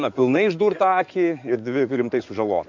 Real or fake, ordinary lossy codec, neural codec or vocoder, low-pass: fake; MP3, 64 kbps; codec, 16 kHz in and 24 kHz out, 2.2 kbps, FireRedTTS-2 codec; 7.2 kHz